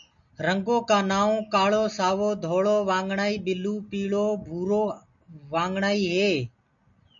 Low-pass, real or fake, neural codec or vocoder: 7.2 kHz; real; none